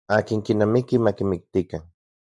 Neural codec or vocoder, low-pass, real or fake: none; 10.8 kHz; real